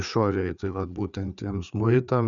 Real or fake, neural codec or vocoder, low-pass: fake; codec, 16 kHz, 4 kbps, FreqCodec, larger model; 7.2 kHz